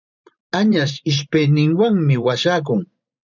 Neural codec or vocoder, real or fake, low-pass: vocoder, 44.1 kHz, 128 mel bands every 512 samples, BigVGAN v2; fake; 7.2 kHz